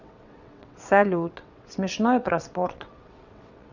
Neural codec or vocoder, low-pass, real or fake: vocoder, 22.05 kHz, 80 mel bands, WaveNeXt; 7.2 kHz; fake